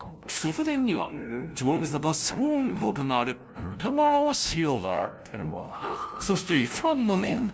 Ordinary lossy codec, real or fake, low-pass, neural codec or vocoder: none; fake; none; codec, 16 kHz, 0.5 kbps, FunCodec, trained on LibriTTS, 25 frames a second